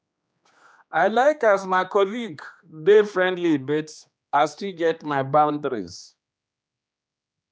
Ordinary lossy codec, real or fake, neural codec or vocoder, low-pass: none; fake; codec, 16 kHz, 2 kbps, X-Codec, HuBERT features, trained on general audio; none